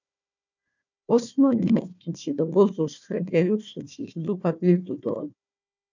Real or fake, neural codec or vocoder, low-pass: fake; codec, 16 kHz, 1 kbps, FunCodec, trained on Chinese and English, 50 frames a second; 7.2 kHz